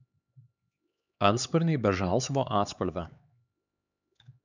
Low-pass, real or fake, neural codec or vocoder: 7.2 kHz; fake; codec, 16 kHz, 4 kbps, X-Codec, HuBERT features, trained on LibriSpeech